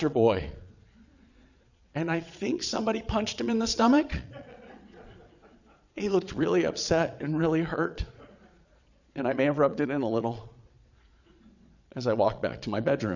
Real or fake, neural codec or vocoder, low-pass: fake; vocoder, 22.05 kHz, 80 mel bands, Vocos; 7.2 kHz